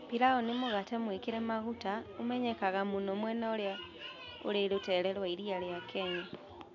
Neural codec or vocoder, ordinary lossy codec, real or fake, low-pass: none; MP3, 64 kbps; real; 7.2 kHz